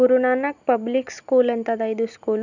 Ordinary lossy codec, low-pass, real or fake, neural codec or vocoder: none; 7.2 kHz; real; none